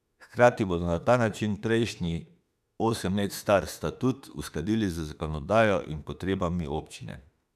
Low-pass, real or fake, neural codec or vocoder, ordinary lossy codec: 14.4 kHz; fake; autoencoder, 48 kHz, 32 numbers a frame, DAC-VAE, trained on Japanese speech; none